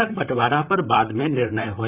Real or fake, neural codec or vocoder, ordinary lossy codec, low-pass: fake; vocoder, 44.1 kHz, 128 mel bands, Pupu-Vocoder; Opus, 64 kbps; 3.6 kHz